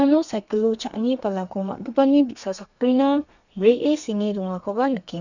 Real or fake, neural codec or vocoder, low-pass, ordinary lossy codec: fake; codec, 32 kHz, 1.9 kbps, SNAC; 7.2 kHz; none